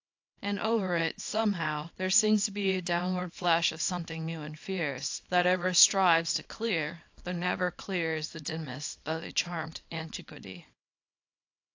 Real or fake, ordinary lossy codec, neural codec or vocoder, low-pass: fake; AAC, 48 kbps; codec, 24 kHz, 0.9 kbps, WavTokenizer, small release; 7.2 kHz